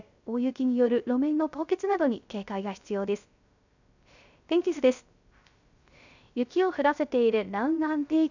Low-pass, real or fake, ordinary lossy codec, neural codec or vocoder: 7.2 kHz; fake; none; codec, 16 kHz, 0.3 kbps, FocalCodec